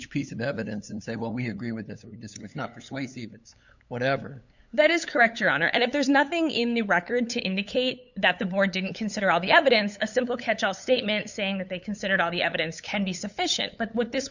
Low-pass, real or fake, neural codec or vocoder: 7.2 kHz; fake; codec, 16 kHz, 8 kbps, FunCodec, trained on LibriTTS, 25 frames a second